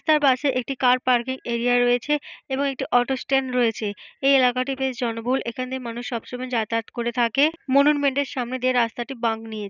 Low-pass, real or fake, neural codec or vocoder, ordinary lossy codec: 7.2 kHz; real; none; none